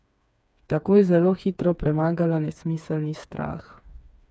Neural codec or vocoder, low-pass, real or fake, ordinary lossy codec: codec, 16 kHz, 4 kbps, FreqCodec, smaller model; none; fake; none